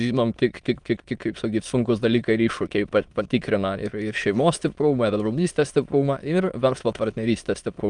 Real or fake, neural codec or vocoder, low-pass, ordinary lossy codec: fake; autoencoder, 22.05 kHz, a latent of 192 numbers a frame, VITS, trained on many speakers; 9.9 kHz; Opus, 32 kbps